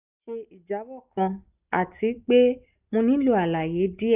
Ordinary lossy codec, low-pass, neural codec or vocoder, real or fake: none; 3.6 kHz; none; real